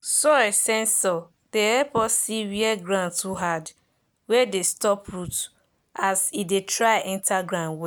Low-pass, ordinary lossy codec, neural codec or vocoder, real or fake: none; none; none; real